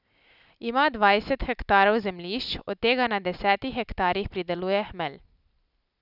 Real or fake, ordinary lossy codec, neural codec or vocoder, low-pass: real; none; none; 5.4 kHz